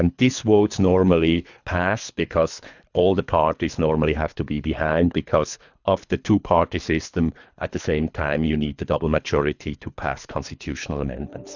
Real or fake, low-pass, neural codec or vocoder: fake; 7.2 kHz; codec, 24 kHz, 3 kbps, HILCodec